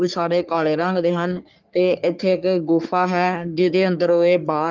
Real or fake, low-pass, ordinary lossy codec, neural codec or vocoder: fake; 7.2 kHz; Opus, 24 kbps; codec, 44.1 kHz, 3.4 kbps, Pupu-Codec